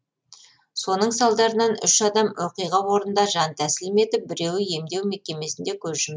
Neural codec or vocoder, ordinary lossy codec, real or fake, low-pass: none; none; real; none